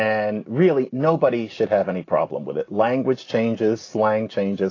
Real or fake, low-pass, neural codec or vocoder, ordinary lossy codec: real; 7.2 kHz; none; AAC, 32 kbps